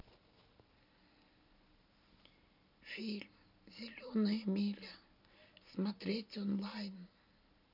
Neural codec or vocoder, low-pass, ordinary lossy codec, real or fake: none; 5.4 kHz; none; real